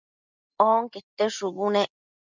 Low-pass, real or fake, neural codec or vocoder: 7.2 kHz; real; none